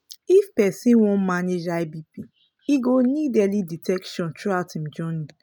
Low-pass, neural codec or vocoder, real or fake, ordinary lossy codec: none; none; real; none